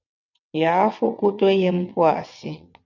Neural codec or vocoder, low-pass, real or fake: vocoder, 22.05 kHz, 80 mel bands, WaveNeXt; 7.2 kHz; fake